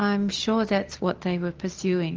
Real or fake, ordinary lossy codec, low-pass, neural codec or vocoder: real; Opus, 24 kbps; 7.2 kHz; none